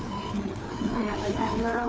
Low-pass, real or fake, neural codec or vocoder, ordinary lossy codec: none; fake; codec, 16 kHz, 4 kbps, FreqCodec, larger model; none